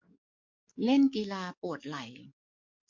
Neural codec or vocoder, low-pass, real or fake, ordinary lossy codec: codec, 44.1 kHz, 7.8 kbps, DAC; 7.2 kHz; fake; MP3, 48 kbps